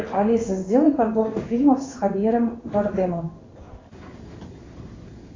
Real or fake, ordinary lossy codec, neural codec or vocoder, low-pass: fake; AAC, 32 kbps; codec, 16 kHz in and 24 kHz out, 1 kbps, XY-Tokenizer; 7.2 kHz